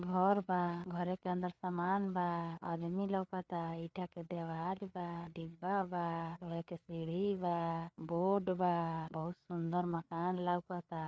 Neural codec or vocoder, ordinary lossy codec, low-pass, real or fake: codec, 16 kHz, 4 kbps, FreqCodec, larger model; none; none; fake